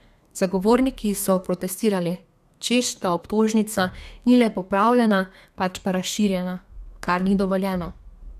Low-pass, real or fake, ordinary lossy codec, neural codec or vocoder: 14.4 kHz; fake; none; codec, 32 kHz, 1.9 kbps, SNAC